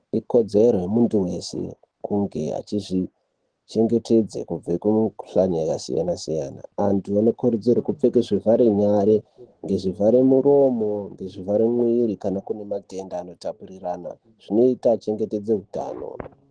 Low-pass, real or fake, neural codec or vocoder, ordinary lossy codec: 9.9 kHz; real; none; Opus, 16 kbps